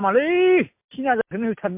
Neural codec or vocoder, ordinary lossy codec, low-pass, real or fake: none; MP3, 32 kbps; 3.6 kHz; real